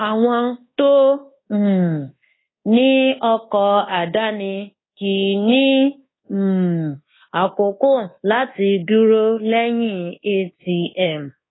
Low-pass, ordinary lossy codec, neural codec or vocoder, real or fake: 7.2 kHz; AAC, 16 kbps; codec, 24 kHz, 1.2 kbps, DualCodec; fake